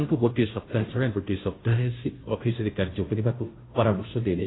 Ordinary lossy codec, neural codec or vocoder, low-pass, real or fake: AAC, 16 kbps; codec, 16 kHz, 0.5 kbps, FunCodec, trained on Chinese and English, 25 frames a second; 7.2 kHz; fake